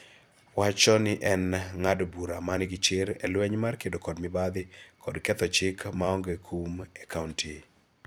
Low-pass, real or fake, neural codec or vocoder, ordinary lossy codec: none; fake; vocoder, 44.1 kHz, 128 mel bands every 256 samples, BigVGAN v2; none